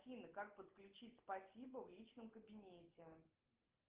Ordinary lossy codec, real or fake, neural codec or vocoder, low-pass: Opus, 16 kbps; real; none; 3.6 kHz